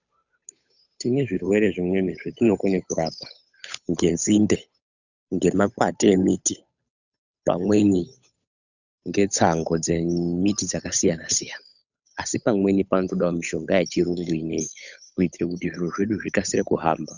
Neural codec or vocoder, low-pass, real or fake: codec, 16 kHz, 8 kbps, FunCodec, trained on Chinese and English, 25 frames a second; 7.2 kHz; fake